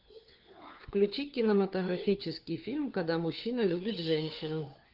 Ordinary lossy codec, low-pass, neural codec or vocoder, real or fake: Opus, 24 kbps; 5.4 kHz; codec, 16 kHz, 4 kbps, FunCodec, trained on LibriTTS, 50 frames a second; fake